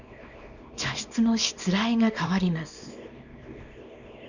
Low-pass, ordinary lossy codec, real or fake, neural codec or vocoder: 7.2 kHz; none; fake; codec, 24 kHz, 0.9 kbps, WavTokenizer, small release